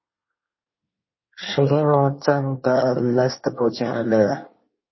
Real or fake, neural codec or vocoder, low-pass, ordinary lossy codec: fake; codec, 24 kHz, 1 kbps, SNAC; 7.2 kHz; MP3, 24 kbps